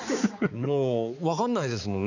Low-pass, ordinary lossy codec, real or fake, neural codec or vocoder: 7.2 kHz; none; fake; codec, 16 kHz, 4 kbps, X-Codec, HuBERT features, trained on balanced general audio